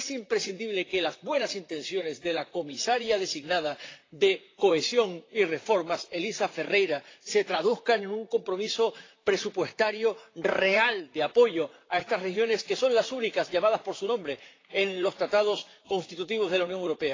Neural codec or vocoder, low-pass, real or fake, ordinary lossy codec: vocoder, 44.1 kHz, 128 mel bands, Pupu-Vocoder; 7.2 kHz; fake; AAC, 32 kbps